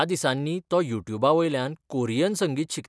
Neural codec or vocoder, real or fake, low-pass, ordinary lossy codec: none; real; none; none